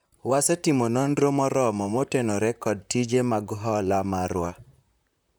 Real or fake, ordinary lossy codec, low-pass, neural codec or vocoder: fake; none; none; vocoder, 44.1 kHz, 128 mel bands, Pupu-Vocoder